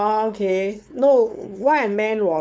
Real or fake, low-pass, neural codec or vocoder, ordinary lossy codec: fake; none; codec, 16 kHz, 4.8 kbps, FACodec; none